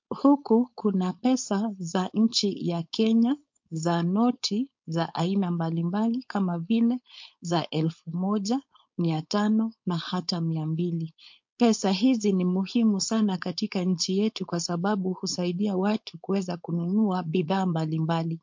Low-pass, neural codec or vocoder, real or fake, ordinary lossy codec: 7.2 kHz; codec, 16 kHz, 4.8 kbps, FACodec; fake; MP3, 48 kbps